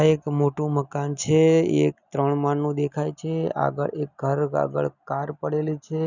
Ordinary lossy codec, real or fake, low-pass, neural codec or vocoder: none; real; 7.2 kHz; none